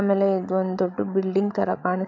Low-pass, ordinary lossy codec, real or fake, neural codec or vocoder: 7.2 kHz; none; fake; codec, 16 kHz, 16 kbps, FreqCodec, larger model